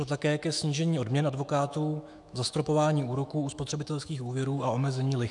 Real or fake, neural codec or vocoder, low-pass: fake; autoencoder, 48 kHz, 128 numbers a frame, DAC-VAE, trained on Japanese speech; 10.8 kHz